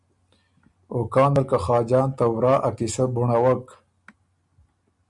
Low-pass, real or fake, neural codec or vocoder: 10.8 kHz; real; none